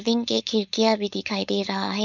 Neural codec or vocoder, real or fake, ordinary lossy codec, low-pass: codec, 16 kHz, 4.8 kbps, FACodec; fake; none; 7.2 kHz